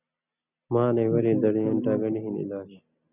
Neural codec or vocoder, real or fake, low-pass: none; real; 3.6 kHz